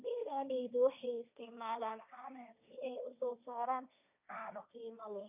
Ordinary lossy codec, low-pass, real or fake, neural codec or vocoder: none; 3.6 kHz; fake; codec, 16 kHz, 1.1 kbps, Voila-Tokenizer